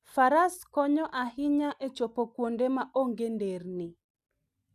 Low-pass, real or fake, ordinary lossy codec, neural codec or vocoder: 14.4 kHz; real; none; none